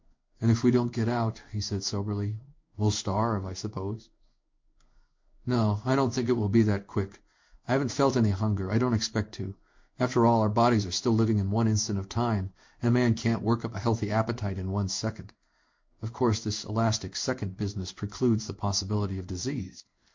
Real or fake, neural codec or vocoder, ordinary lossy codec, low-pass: fake; codec, 16 kHz in and 24 kHz out, 1 kbps, XY-Tokenizer; MP3, 48 kbps; 7.2 kHz